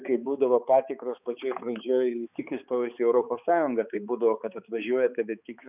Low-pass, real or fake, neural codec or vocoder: 3.6 kHz; fake; codec, 16 kHz, 4 kbps, X-Codec, HuBERT features, trained on balanced general audio